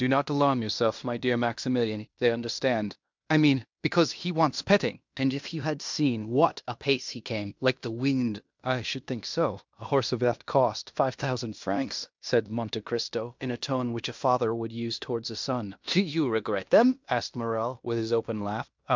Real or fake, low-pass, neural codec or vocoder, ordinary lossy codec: fake; 7.2 kHz; codec, 16 kHz in and 24 kHz out, 0.9 kbps, LongCat-Audio-Codec, fine tuned four codebook decoder; MP3, 64 kbps